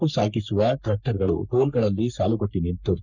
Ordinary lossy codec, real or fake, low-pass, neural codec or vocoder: none; fake; 7.2 kHz; codec, 44.1 kHz, 3.4 kbps, Pupu-Codec